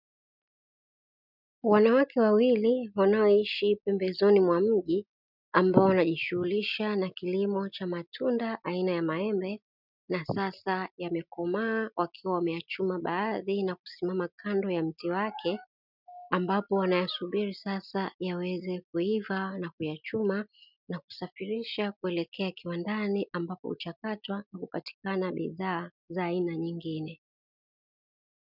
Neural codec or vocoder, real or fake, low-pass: none; real; 5.4 kHz